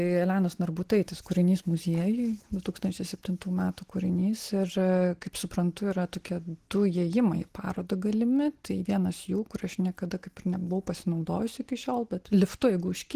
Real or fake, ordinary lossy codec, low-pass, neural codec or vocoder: real; Opus, 16 kbps; 14.4 kHz; none